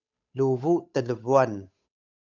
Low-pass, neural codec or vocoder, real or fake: 7.2 kHz; codec, 16 kHz, 8 kbps, FunCodec, trained on Chinese and English, 25 frames a second; fake